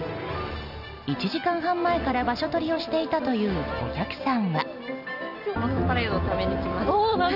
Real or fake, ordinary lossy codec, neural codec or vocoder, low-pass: real; none; none; 5.4 kHz